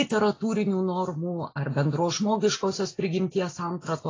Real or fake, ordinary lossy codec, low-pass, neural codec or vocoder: real; AAC, 32 kbps; 7.2 kHz; none